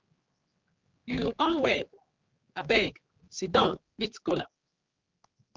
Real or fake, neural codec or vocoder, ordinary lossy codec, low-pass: fake; codec, 16 kHz, 4 kbps, X-Codec, HuBERT features, trained on general audio; Opus, 32 kbps; 7.2 kHz